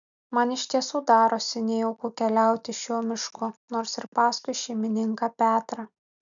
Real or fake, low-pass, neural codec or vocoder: real; 7.2 kHz; none